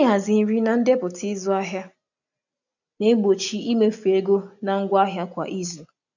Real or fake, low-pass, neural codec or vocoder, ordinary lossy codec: real; 7.2 kHz; none; none